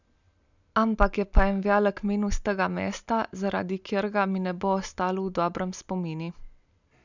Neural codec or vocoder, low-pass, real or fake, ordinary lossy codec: none; 7.2 kHz; real; none